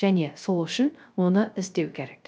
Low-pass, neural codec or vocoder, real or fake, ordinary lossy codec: none; codec, 16 kHz, 0.3 kbps, FocalCodec; fake; none